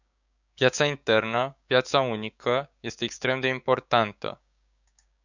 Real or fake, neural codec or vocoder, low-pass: fake; codec, 16 kHz, 6 kbps, DAC; 7.2 kHz